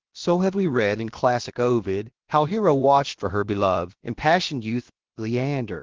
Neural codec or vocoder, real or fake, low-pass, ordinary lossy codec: codec, 16 kHz, about 1 kbps, DyCAST, with the encoder's durations; fake; 7.2 kHz; Opus, 16 kbps